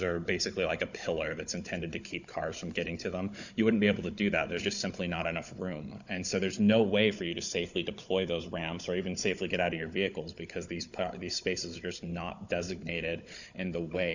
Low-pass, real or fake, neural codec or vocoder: 7.2 kHz; fake; codec, 16 kHz, 16 kbps, FunCodec, trained on Chinese and English, 50 frames a second